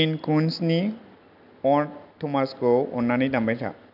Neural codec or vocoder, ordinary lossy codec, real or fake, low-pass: none; AAC, 48 kbps; real; 5.4 kHz